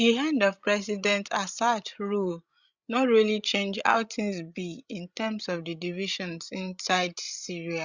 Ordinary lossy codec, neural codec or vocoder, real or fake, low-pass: Opus, 64 kbps; codec, 16 kHz, 16 kbps, FreqCodec, larger model; fake; 7.2 kHz